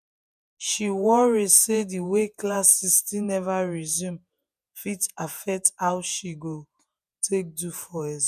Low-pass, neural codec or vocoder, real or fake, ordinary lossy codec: 14.4 kHz; vocoder, 48 kHz, 128 mel bands, Vocos; fake; none